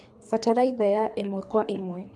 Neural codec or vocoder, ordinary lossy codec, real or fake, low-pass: codec, 24 kHz, 3 kbps, HILCodec; none; fake; none